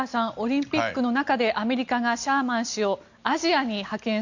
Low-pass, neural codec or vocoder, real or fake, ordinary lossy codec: 7.2 kHz; none; real; none